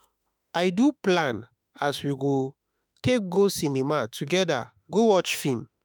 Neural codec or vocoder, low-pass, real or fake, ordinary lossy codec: autoencoder, 48 kHz, 32 numbers a frame, DAC-VAE, trained on Japanese speech; none; fake; none